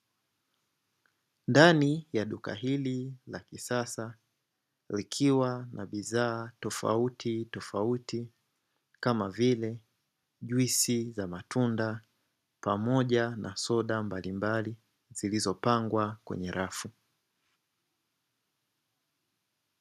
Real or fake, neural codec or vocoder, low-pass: real; none; 14.4 kHz